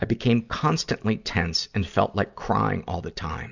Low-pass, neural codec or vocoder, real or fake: 7.2 kHz; none; real